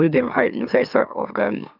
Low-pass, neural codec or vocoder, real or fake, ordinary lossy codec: 5.4 kHz; autoencoder, 44.1 kHz, a latent of 192 numbers a frame, MeloTTS; fake; none